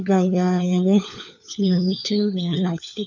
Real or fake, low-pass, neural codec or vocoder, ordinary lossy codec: fake; 7.2 kHz; codec, 16 kHz, 4 kbps, FunCodec, trained on LibriTTS, 50 frames a second; none